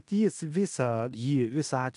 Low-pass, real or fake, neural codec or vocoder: 10.8 kHz; fake; codec, 16 kHz in and 24 kHz out, 0.9 kbps, LongCat-Audio-Codec, fine tuned four codebook decoder